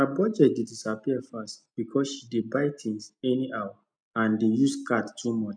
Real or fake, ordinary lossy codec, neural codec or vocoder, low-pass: real; none; none; 9.9 kHz